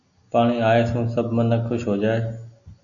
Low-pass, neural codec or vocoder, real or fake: 7.2 kHz; none; real